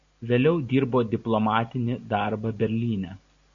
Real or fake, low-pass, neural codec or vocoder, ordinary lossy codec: real; 7.2 kHz; none; MP3, 96 kbps